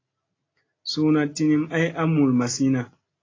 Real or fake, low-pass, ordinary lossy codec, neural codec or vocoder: real; 7.2 kHz; AAC, 32 kbps; none